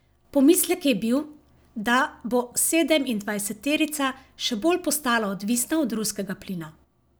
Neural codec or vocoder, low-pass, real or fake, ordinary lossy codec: none; none; real; none